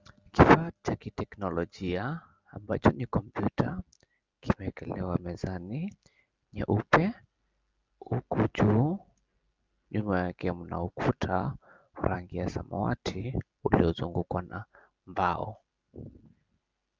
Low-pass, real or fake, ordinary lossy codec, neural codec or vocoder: 7.2 kHz; real; Opus, 32 kbps; none